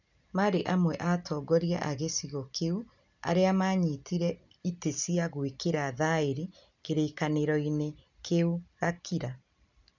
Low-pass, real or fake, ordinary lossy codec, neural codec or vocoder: 7.2 kHz; real; none; none